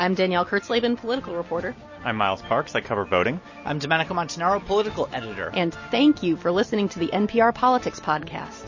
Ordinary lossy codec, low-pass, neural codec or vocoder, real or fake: MP3, 32 kbps; 7.2 kHz; none; real